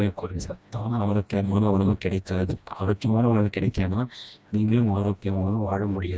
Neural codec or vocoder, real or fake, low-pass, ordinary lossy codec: codec, 16 kHz, 1 kbps, FreqCodec, smaller model; fake; none; none